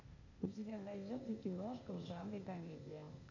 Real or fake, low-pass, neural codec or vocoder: fake; 7.2 kHz; codec, 16 kHz, 0.8 kbps, ZipCodec